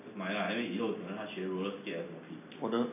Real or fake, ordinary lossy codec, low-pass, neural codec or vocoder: real; none; 3.6 kHz; none